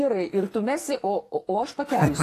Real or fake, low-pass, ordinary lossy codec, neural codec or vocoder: fake; 14.4 kHz; AAC, 48 kbps; codec, 44.1 kHz, 2.6 kbps, SNAC